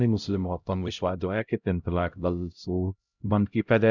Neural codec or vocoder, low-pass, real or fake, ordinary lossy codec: codec, 16 kHz, 0.5 kbps, X-Codec, HuBERT features, trained on LibriSpeech; 7.2 kHz; fake; none